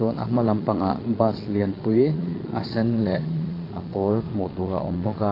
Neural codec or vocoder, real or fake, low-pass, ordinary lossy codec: vocoder, 22.05 kHz, 80 mel bands, Vocos; fake; 5.4 kHz; AAC, 24 kbps